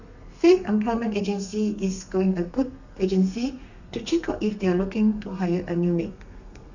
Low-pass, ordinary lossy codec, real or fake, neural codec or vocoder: 7.2 kHz; none; fake; codec, 32 kHz, 1.9 kbps, SNAC